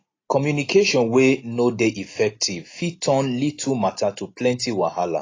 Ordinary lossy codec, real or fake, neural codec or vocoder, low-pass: AAC, 32 kbps; real; none; 7.2 kHz